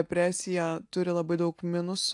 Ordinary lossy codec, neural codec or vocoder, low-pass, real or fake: AAC, 64 kbps; none; 10.8 kHz; real